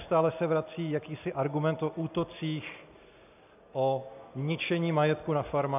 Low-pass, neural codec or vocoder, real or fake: 3.6 kHz; none; real